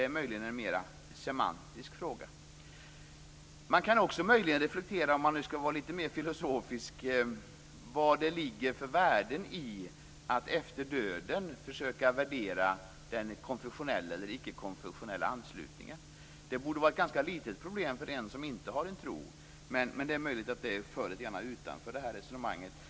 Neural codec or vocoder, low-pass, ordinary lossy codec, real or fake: none; none; none; real